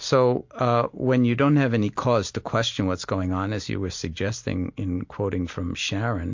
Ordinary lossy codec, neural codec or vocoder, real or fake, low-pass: MP3, 48 kbps; none; real; 7.2 kHz